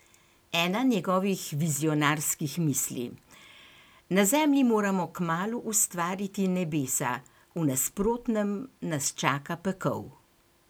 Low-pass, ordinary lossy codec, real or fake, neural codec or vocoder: none; none; real; none